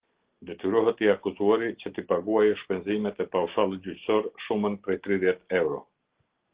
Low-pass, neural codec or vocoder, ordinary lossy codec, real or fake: 3.6 kHz; none; Opus, 16 kbps; real